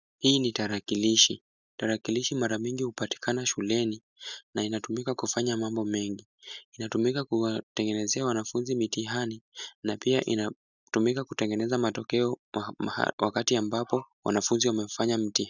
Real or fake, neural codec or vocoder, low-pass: real; none; 7.2 kHz